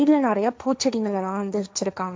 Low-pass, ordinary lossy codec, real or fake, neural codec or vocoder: none; none; fake; codec, 16 kHz, 1.1 kbps, Voila-Tokenizer